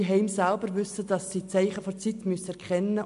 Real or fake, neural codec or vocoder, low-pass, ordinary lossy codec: real; none; 10.8 kHz; MP3, 64 kbps